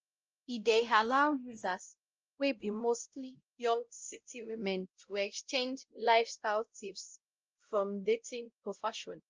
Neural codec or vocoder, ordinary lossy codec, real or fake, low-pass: codec, 16 kHz, 0.5 kbps, X-Codec, WavLM features, trained on Multilingual LibriSpeech; Opus, 24 kbps; fake; 7.2 kHz